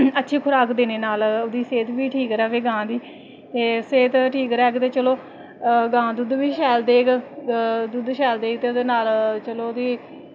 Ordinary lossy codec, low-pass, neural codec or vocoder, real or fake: none; none; none; real